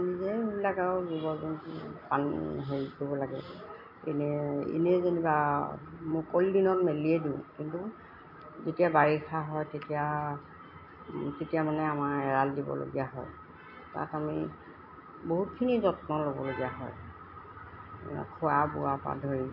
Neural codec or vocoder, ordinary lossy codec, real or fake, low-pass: none; MP3, 32 kbps; real; 5.4 kHz